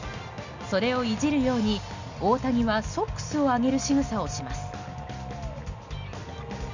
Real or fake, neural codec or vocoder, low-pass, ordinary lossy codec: real; none; 7.2 kHz; none